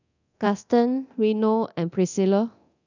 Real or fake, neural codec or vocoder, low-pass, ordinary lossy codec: fake; codec, 24 kHz, 0.9 kbps, DualCodec; 7.2 kHz; none